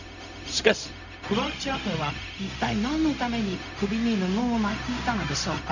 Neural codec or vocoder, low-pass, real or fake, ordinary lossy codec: codec, 16 kHz, 0.4 kbps, LongCat-Audio-Codec; 7.2 kHz; fake; none